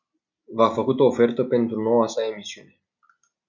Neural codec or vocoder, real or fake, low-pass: none; real; 7.2 kHz